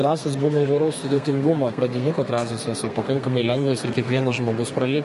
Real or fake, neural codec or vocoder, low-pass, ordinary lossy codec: fake; codec, 32 kHz, 1.9 kbps, SNAC; 14.4 kHz; MP3, 48 kbps